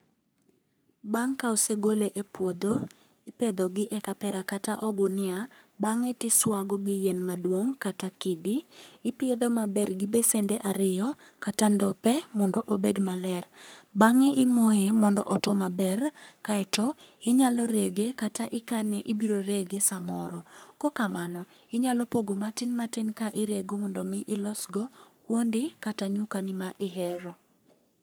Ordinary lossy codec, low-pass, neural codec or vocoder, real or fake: none; none; codec, 44.1 kHz, 3.4 kbps, Pupu-Codec; fake